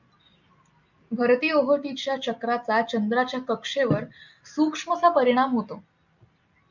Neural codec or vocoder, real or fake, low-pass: none; real; 7.2 kHz